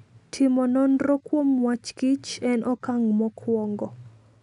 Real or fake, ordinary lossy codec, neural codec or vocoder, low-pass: real; none; none; 10.8 kHz